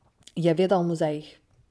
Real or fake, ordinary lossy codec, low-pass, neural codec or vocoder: fake; none; none; vocoder, 22.05 kHz, 80 mel bands, Vocos